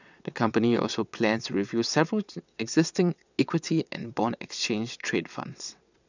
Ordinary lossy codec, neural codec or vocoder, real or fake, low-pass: none; vocoder, 22.05 kHz, 80 mel bands, WaveNeXt; fake; 7.2 kHz